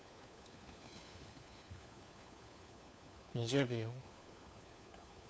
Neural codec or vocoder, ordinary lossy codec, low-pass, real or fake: codec, 16 kHz, 4 kbps, FunCodec, trained on LibriTTS, 50 frames a second; none; none; fake